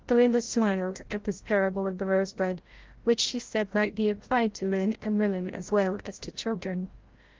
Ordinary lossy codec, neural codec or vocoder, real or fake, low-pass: Opus, 16 kbps; codec, 16 kHz, 0.5 kbps, FreqCodec, larger model; fake; 7.2 kHz